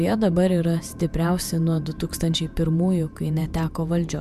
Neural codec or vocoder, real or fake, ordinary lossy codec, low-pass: vocoder, 48 kHz, 128 mel bands, Vocos; fake; MP3, 96 kbps; 14.4 kHz